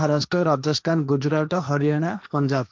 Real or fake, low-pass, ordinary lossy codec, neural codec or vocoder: fake; none; none; codec, 16 kHz, 1.1 kbps, Voila-Tokenizer